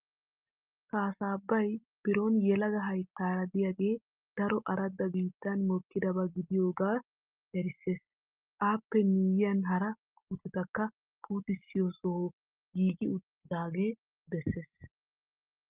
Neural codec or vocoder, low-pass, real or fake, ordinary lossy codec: none; 3.6 kHz; real; Opus, 24 kbps